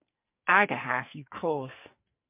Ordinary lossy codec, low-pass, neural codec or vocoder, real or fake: MP3, 32 kbps; 3.6 kHz; codec, 44.1 kHz, 2.6 kbps, SNAC; fake